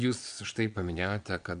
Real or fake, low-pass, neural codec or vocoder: fake; 9.9 kHz; vocoder, 22.05 kHz, 80 mel bands, Vocos